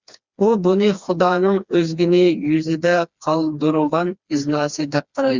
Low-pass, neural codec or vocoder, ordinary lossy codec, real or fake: 7.2 kHz; codec, 16 kHz, 2 kbps, FreqCodec, smaller model; Opus, 64 kbps; fake